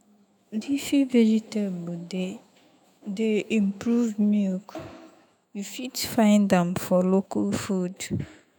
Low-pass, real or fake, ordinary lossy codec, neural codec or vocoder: none; fake; none; autoencoder, 48 kHz, 128 numbers a frame, DAC-VAE, trained on Japanese speech